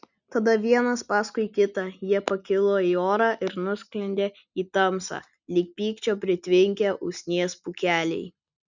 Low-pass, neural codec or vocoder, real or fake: 7.2 kHz; none; real